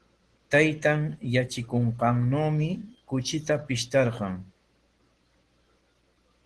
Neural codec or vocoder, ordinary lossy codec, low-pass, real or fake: none; Opus, 16 kbps; 10.8 kHz; real